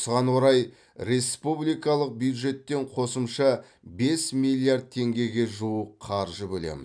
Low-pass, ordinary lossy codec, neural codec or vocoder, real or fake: 9.9 kHz; none; none; real